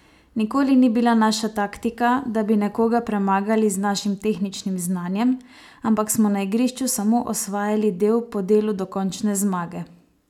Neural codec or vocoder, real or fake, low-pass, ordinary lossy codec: none; real; 19.8 kHz; none